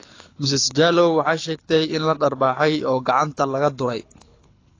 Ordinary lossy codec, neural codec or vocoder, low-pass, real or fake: AAC, 32 kbps; codec, 24 kHz, 6 kbps, HILCodec; 7.2 kHz; fake